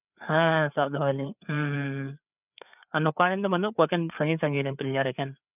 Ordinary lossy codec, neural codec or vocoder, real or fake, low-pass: none; codec, 16 kHz, 4 kbps, FreqCodec, larger model; fake; 3.6 kHz